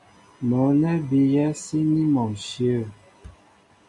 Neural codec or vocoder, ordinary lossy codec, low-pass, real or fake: none; MP3, 96 kbps; 10.8 kHz; real